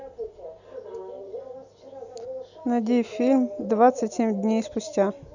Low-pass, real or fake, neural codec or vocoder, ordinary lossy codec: 7.2 kHz; real; none; none